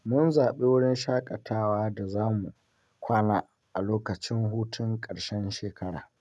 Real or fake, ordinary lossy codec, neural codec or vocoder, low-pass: real; none; none; none